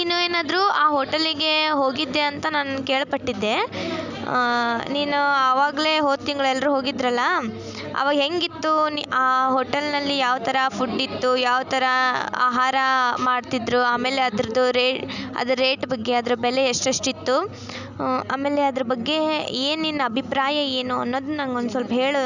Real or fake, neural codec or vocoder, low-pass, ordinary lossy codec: real; none; 7.2 kHz; none